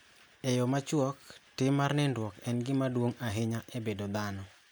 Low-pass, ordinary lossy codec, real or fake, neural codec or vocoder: none; none; real; none